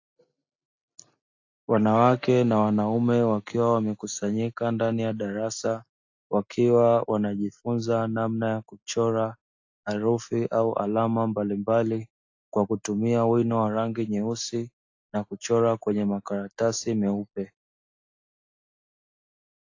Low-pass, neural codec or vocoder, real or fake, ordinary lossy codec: 7.2 kHz; none; real; AAC, 48 kbps